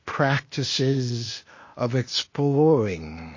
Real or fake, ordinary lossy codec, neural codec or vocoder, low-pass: fake; MP3, 32 kbps; codec, 16 kHz, 0.8 kbps, ZipCodec; 7.2 kHz